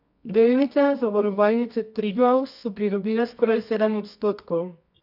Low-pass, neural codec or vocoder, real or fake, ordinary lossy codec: 5.4 kHz; codec, 24 kHz, 0.9 kbps, WavTokenizer, medium music audio release; fake; none